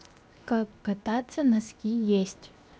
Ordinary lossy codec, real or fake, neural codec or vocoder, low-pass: none; fake; codec, 16 kHz, 0.7 kbps, FocalCodec; none